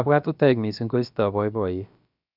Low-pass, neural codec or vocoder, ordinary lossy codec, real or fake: 5.4 kHz; codec, 16 kHz, about 1 kbps, DyCAST, with the encoder's durations; none; fake